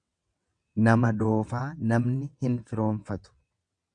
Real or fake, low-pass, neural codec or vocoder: fake; 9.9 kHz; vocoder, 22.05 kHz, 80 mel bands, WaveNeXt